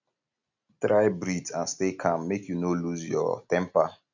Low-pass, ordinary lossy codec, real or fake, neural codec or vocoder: 7.2 kHz; none; real; none